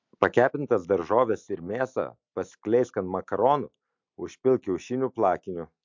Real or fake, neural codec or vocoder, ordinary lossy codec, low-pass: real; none; MP3, 64 kbps; 7.2 kHz